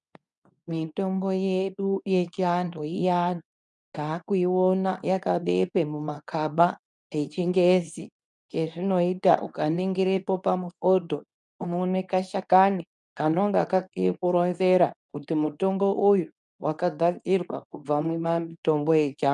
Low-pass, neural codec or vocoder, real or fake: 10.8 kHz; codec, 24 kHz, 0.9 kbps, WavTokenizer, medium speech release version 2; fake